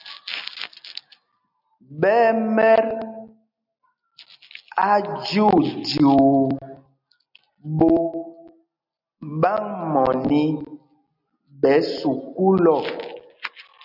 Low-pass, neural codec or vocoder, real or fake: 5.4 kHz; none; real